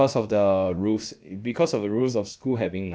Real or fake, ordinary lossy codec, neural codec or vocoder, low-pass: fake; none; codec, 16 kHz, about 1 kbps, DyCAST, with the encoder's durations; none